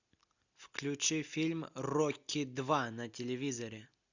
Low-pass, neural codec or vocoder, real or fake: 7.2 kHz; none; real